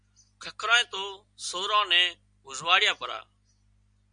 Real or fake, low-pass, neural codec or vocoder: real; 9.9 kHz; none